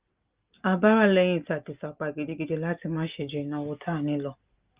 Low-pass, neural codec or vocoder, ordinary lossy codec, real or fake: 3.6 kHz; none; Opus, 32 kbps; real